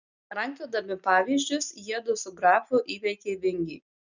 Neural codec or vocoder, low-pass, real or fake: none; 7.2 kHz; real